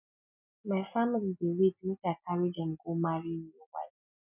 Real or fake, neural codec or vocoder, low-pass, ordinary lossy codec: real; none; 3.6 kHz; none